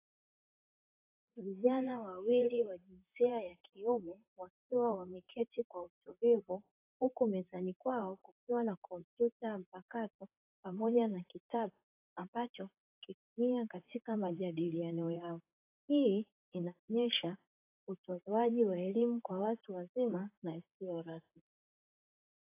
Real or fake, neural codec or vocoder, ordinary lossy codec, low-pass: fake; vocoder, 44.1 kHz, 128 mel bands, Pupu-Vocoder; AAC, 24 kbps; 3.6 kHz